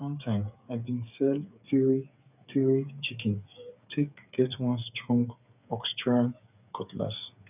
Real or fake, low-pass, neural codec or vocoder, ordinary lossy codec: fake; 3.6 kHz; codec, 16 kHz, 8 kbps, FreqCodec, smaller model; none